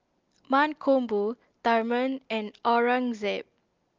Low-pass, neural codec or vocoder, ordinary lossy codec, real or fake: 7.2 kHz; none; Opus, 32 kbps; real